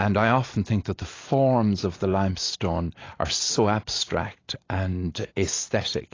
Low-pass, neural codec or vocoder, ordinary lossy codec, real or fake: 7.2 kHz; none; AAC, 32 kbps; real